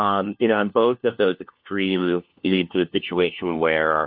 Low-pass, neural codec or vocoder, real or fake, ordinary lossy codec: 5.4 kHz; codec, 16 kHz, 1 kbps, FunCodec, trained on LibriTTS, 50 frames a second; fake; MP3, 48 kbps